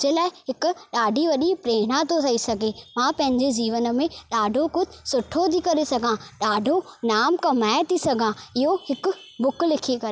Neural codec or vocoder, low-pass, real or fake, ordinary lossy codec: none; none; real; none